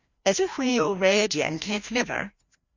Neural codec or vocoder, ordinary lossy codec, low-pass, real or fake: codec, 16 kHz, 1 kbps, FreqCodec, larger model; Opus, 64 kbps; 7.2 kHz; fake